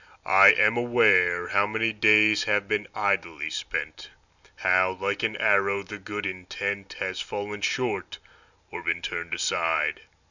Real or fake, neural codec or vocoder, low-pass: real; none; 7.2 kHz